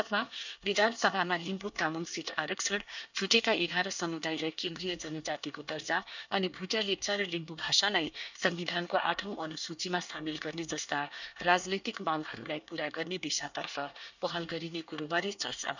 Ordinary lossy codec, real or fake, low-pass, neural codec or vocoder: none; fake; 7.2 kHz; codec, 24 kHz, 1 kbps, SNAC